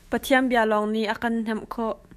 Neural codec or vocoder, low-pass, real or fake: autoencoder, 48 kHz, 128 numbers a frame, DAC-VAE, trained on Japanese speech; 14.4 kHz; fake